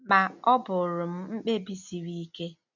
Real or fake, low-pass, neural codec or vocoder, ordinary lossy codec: real; 7.2 kHz; none; none